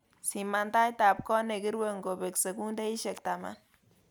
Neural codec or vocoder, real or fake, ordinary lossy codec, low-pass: none; real; none; none